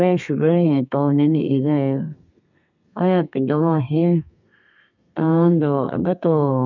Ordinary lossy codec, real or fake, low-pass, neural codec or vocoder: none; fake; 7.2 kHz; codec, 32 kHz, 1.9 kbps, SNAC